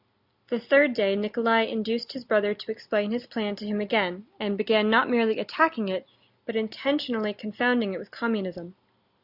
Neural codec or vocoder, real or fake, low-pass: none; real; 5.4 kHz